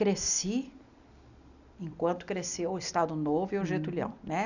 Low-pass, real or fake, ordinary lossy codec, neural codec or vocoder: 7.2 kHz; real; none; none